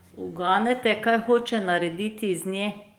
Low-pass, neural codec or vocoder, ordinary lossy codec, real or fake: 19.8 kHz; codec, 44.1 kHz, 7.8 kbps, DAC; Opus, 32 kbps; fake